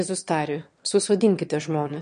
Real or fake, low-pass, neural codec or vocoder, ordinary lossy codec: fake; 9.9 kHz; autoencoder, 22.05 kHz, a latent of 192 numbers a frame, VITS, trained on one speaker; MP3, 48 kbps